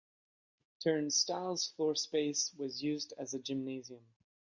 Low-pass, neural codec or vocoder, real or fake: 7.2 kHz; none; real